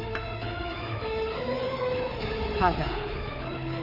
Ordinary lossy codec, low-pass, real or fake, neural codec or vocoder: Opus, 24 kbps; 5.4 kHz; fake; codec, 24 kHz, 3.1 kbps, DualCodec